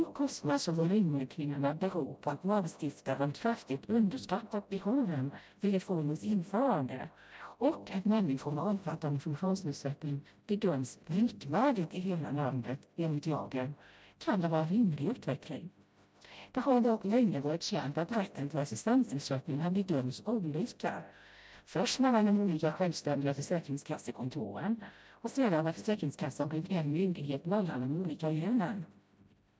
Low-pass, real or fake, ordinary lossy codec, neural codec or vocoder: none; fake; none; codec, 16 kHz, 0.5 kbps, FreqCodec, smaller model